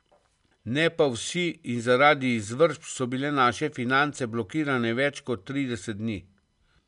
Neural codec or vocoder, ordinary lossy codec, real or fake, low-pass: none; none; real; 10.8 kHz